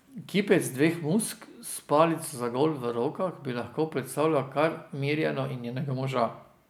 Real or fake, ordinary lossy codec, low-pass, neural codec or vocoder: real; none; none; none